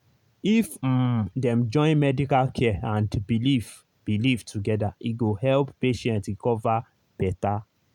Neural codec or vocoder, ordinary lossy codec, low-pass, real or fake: none; none; 19.8 kHz; real